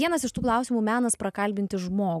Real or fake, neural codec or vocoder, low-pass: real; none; 14.4 kHz